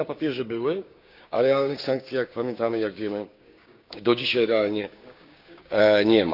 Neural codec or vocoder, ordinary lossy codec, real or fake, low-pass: codec, 16 kHz, 6 kbps, DAC; none; fake; 5.4 kHz